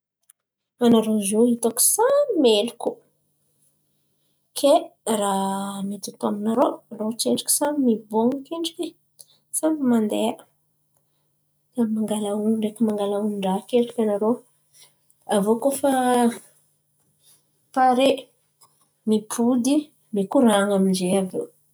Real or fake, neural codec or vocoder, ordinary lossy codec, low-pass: real; none; none; none